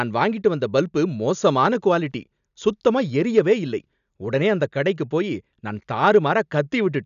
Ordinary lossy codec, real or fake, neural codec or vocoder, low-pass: none; real; none; 7.2 kHz